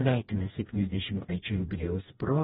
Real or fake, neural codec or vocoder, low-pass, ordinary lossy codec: fake; codec, 16 kHz, 1 kbps, FreqCodec, smaller model; 7.2 kHz; AAC, 16 kbps